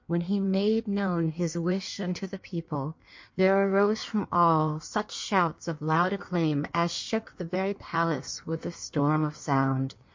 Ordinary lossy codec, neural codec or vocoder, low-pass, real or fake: MP3, 48 kbps; codec, 16 kHz in and 24 kHz out, 1.1 kbps, FireRedTTS-2 codec; 7.2 kHz; fake